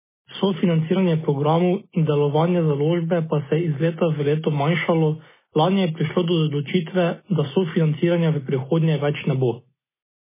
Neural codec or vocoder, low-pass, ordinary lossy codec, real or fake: none; 3.6 kHz; MP3, 16 kbps; real